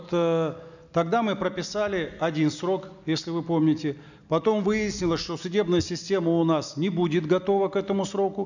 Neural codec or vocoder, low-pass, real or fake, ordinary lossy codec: none; 7.2 kHz; real; none